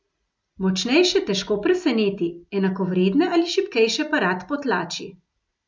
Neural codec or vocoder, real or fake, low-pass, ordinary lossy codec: none; real; none; none